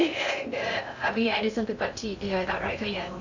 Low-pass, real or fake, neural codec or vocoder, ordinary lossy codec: 7.2 kHz; fake; codec, 16 kHz in and 24 kHz out, 0.6 kbps, FocalCodec, streaming, 2048 codes; none